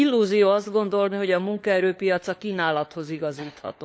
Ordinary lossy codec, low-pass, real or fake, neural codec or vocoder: none; none; fake; codec, 16 kHz, 4 kbps, FunCodec, trained on LibriTTS, 50 frames a second